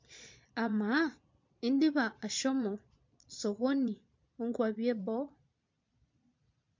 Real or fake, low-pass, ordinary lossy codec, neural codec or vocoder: fake; 7.2 kHz; MP3, 48 kbps; vocoder, 22.05 kHz, 80 mel bands, Vocos